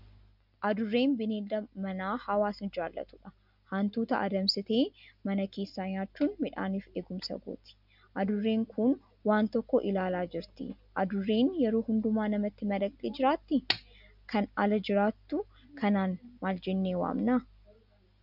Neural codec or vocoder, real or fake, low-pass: none; real; 5.4 kHz